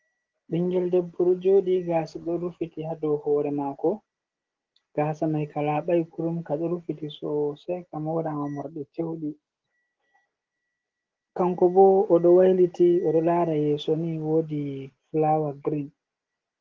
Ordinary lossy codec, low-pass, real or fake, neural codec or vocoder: Opus, 16 kbps; 7.2 kHz; real; none